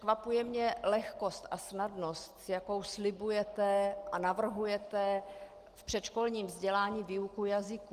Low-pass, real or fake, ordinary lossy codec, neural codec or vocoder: 14.4 kHz; real; Opus, 24 kbps; none